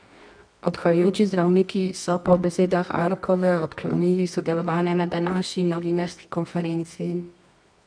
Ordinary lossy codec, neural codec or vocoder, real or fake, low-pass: none; codec, 24 kHz, 0.9 kbps, WavTokenizer, medium music audio release; fake; 9.9 kHz